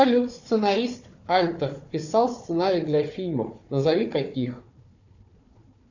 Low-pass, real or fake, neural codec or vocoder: 7.2 kHz; fake; codec, 16 kHz, 4 kbps, FunCodec, trained on Chinese and English, 50 frames a second